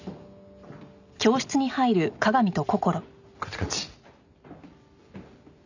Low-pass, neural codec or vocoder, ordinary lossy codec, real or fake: 7.2 kHz; none; none; real